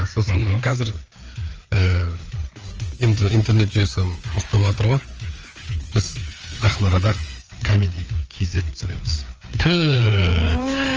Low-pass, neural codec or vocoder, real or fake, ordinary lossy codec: 7.2 kHz; codec, 16 kHz, 4 kbps, FreqCodec, larger model; fake; Opus, 24 kbps